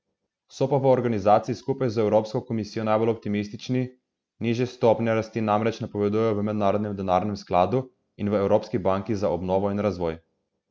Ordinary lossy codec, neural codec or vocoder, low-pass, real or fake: none; none; none; real